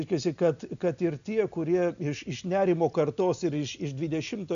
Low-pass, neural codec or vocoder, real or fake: 7.2 kHz; none; real